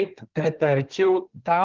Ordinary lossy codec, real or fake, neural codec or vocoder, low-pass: Opus, 16 kbps; fake; codec, 24 kHz, 1 kbps, SNAC; 7.2 kHz